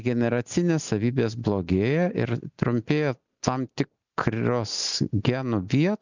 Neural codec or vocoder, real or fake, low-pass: none; real; 7.2 kHz